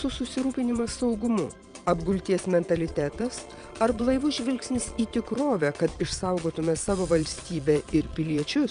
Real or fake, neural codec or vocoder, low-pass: fake; vocoder, 22.05 kHz, 80 mel bands, Vocos; 9.9 kHz